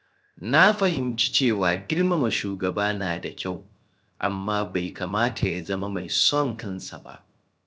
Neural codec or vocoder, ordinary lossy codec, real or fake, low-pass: codec, 16 kHz, 0.7 kbps, FocalCodec; none; fake; none